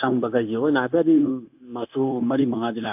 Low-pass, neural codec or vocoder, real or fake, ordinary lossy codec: 3.6 kHz; codec, 16 kHz, 0.9 kbps, LongCat-Audio-Codec; fake; AAC, 32 kbps